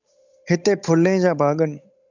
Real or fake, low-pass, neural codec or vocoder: fake; 7.2 kHz; codec, 16 kHz, 8 kbps, FunCodec, trained on Chinese and English, 25 frames a second